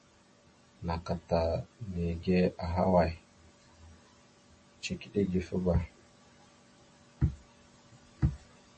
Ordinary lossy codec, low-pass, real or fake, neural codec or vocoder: MP3, 32 kbps; 10.8 kHz; real; none